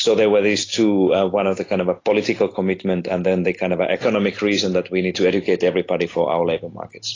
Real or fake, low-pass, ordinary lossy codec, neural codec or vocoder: real; 7.2 kHz; AAC, 32 kbps; none